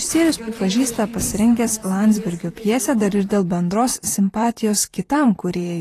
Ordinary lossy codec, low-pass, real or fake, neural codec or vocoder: AAC, 48 kbps; 14.4 kHz; fake; vocoder, 44.1 kHz, 128 mel bands, Pupu-Vocoder